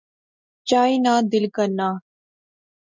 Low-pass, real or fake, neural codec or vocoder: 7.2 kHz; real; none